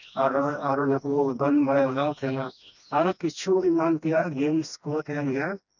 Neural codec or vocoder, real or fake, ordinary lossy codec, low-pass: codec, 16 kHz, 1 kbps, FreqCodec, smaller model; fake; none; 7.2 kHz